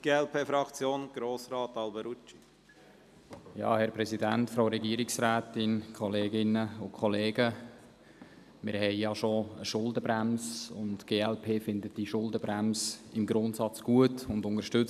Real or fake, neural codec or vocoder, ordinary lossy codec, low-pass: real; none; none; 14.4 kHz